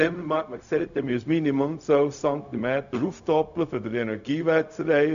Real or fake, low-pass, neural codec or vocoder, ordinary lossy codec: fake; 7.2 kHz; codec, 16 kHz, 0.4 kbps, LongCat-Audio-Codec; MP3, 96 kbps